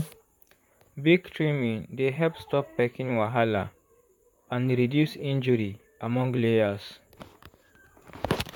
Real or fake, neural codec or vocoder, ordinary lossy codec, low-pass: fake; vocoder, 44.1 kHz, 128 mel bands, Pupu-Vocoder; none; 19.8 kHz